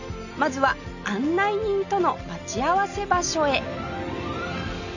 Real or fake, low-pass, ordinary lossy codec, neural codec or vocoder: real; 7.2 kHz; none; none